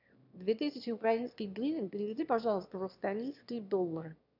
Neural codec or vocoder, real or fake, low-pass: autoencoder, 22.05 kHz, a latent of 192 numbers a frame, VITS, trained on one speaker; fake; 5.4 kHz